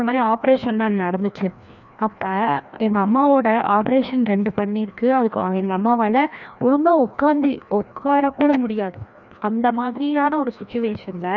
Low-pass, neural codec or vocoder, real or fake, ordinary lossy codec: 7.2 kHz; codec, 16 kHz, 1 kbps, FreqCodec, larger model; fake; none